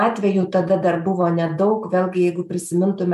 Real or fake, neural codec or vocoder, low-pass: real; none; 14.4 kHz